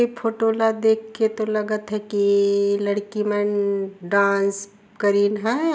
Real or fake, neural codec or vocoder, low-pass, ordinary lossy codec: real; none; none; none